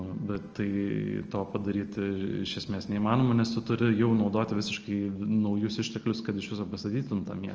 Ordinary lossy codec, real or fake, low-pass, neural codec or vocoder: Opus, 24 kbps; real; 7.2 kHz; none